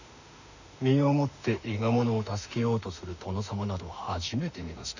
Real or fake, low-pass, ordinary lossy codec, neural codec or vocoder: fake; 7.2 kHz; none; autoencoder, 48 kHz, 32 numbers a frame, DAC-VAE, trained on Japanese speech